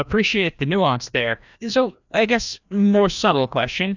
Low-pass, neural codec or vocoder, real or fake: 7.2 kHz; codec, 16 kHz, 1 kbps, FreqCodec, larger model; fake